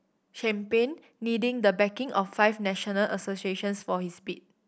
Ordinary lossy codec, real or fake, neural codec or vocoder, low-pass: none; real; none; none